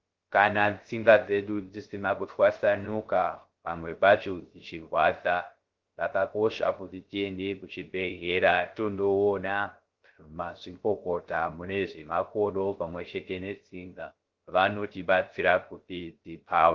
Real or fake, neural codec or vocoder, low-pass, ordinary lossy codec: fake; codec, 16 kHz, 0.3 kbps, FocalCodec; 7.2 kHz; Opus, 16 kbps